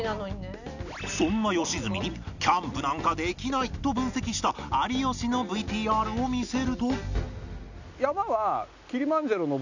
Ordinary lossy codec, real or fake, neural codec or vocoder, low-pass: none; real; none; 7.2 kHz